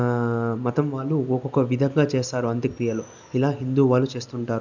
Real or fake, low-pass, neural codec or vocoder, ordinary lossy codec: real; 7.2 kHz; none; none